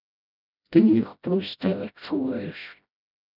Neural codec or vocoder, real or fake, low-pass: codec, 16 kHz, 0.5 kbps, FreqCodec, smaller model; fake; 5.4 kHz